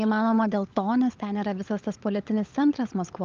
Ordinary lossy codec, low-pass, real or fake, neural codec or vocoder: Opus, 32 kbps; 7.2 kHz; fake; codec, 16 kHz, 16 kbps, FunCodec, trained on LibriTTS, 50 frames a second